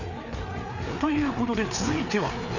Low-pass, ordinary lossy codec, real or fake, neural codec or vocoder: 7.2 kHz; none; fake; codec, 16 kHz, 4 kbps, FreqCodec, larger model